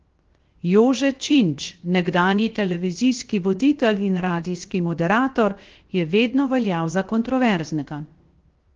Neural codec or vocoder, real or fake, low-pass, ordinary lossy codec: codec, 16 kHz, 0.7 kbps, FocalCodec; fake; 7.2 kHz; Opus, 16 kbps